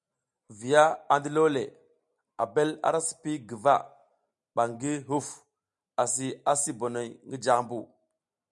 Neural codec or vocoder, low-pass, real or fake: none; 10.8 kHz; real